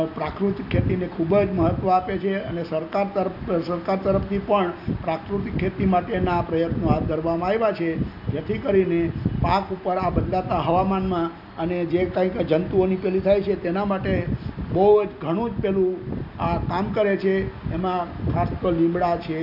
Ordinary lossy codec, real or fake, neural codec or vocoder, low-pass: none; real; none; 5.4 kHz